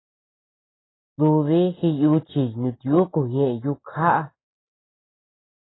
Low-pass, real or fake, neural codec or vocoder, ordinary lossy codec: 7.2 kHz; real; none; AAC, 16 kbps